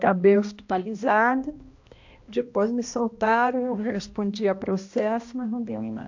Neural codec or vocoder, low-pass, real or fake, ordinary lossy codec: codec, 16 kHz, 1 kbps, X-Codec, HuBERT features, trained on balanced general audio; 7.2 kHz; fake; AAC, 48 kbps